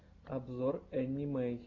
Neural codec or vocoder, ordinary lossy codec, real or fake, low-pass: none; AAC, 48 kbps; real; 7.2 kHz